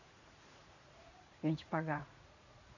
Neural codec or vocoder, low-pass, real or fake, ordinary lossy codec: vocoder, 44.1 kHz, 80 mel bands, Vocos; 7.2 kHz; fake; none